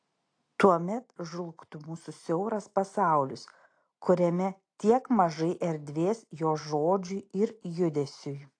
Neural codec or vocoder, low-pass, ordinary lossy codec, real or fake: none; 9.9 kHz; AAC, 48 kbps; real